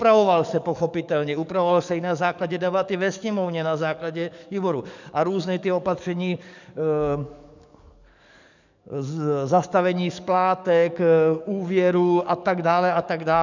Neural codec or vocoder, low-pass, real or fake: codec, 44.1 kHz, 7.8 kbps, DAC; 7.2 kHz; fake